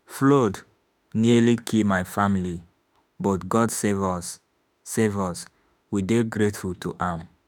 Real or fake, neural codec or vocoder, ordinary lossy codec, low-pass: fake; autoencoder, 48 kHz, 32 numbers a frame, DAC-VAE, trained on Japanese speech; none; none